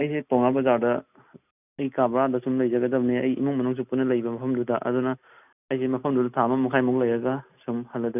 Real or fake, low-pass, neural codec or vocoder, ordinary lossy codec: real; 3.6 kHz; none; none